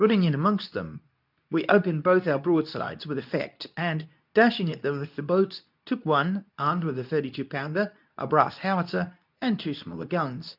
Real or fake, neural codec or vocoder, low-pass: fake; codec, 24 kHz, 0.9 kbps, WavTokenizer, medium speech release version 2; 5.4 kHz